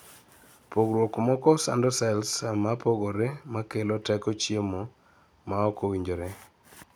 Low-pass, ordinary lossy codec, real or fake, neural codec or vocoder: none; none; real; none